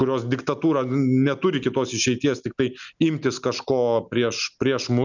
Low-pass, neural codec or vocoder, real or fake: 7.2 kHz; none; real